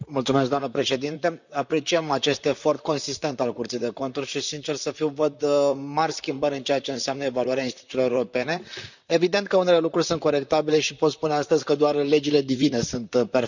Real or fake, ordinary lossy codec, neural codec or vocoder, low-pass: fake; none; vocoder, 44.1 kHz, 128 mel bands, Pupu-Vocoder; 7.2 kHz